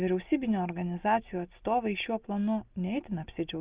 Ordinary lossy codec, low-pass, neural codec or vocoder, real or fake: Opus, 32 kbps; 3.6 kHz; none; real